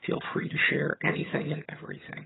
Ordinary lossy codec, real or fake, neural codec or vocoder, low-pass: AAC, 16 kbps; fake; vocoder, 22.05 kHz, 80 mel bands, HiFi-GAN; 7.2 kHz